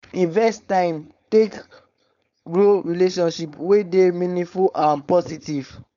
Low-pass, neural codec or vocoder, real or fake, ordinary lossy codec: 7.2 kHz; codec, 16 kHz, 4.8 kbps, FACodec; fake; MP3, 96 kbps